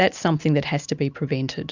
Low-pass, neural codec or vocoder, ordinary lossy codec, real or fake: 7.2 kHz; none; Opus, 64 kbps; real